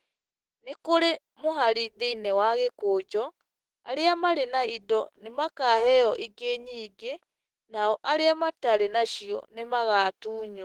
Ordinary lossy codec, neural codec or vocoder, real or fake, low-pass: Opus, 16 kbps; autoencoder, 48 kHz, 32 numbers a frame, DAC-VAE, trained on Japanese speech; fake; 19.8 kHz